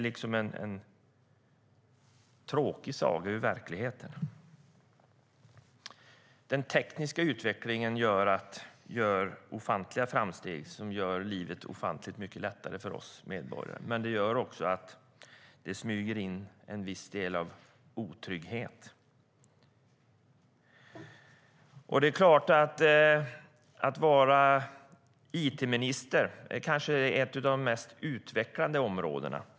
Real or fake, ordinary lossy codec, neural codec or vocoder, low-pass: real; none; none; none